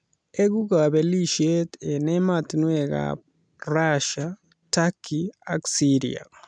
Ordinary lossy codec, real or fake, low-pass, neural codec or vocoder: none; real; 9.9 kHz; none